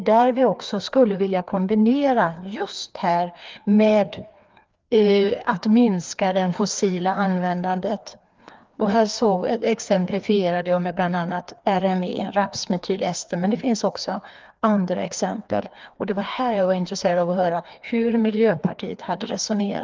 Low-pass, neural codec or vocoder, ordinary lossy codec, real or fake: 7.2 kHz; codec, 16 kHz, 2 kbps, FreqCodec, larger model; Opus, 32 kbps; fake